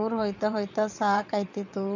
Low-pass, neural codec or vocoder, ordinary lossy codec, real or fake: 7.2 kHz; vocoder, 22.05 kHz, 80 mel bands, WaveNeXt; none; fake